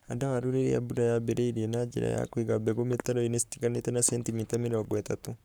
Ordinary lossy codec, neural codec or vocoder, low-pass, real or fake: none; codec, 44.1 kHz, 7.8 kbps, Pupu-Codec; none; fake